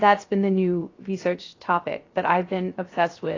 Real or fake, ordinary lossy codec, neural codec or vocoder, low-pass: fake; AAC, 32 kbps; codec, 16 kHz, 0.3 kbps, FocalCodec; 7.2 kHz